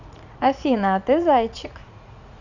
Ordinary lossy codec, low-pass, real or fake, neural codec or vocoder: none; 7.2 kHz; fake; vocoder, 44.1 kHz, 80 mel bands, Vocos